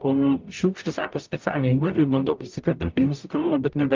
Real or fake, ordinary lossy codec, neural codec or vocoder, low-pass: fake; Opus, 24 kbps; codec, 44.1 kHz, 0.9 kbps, DAC; 7.2 kHz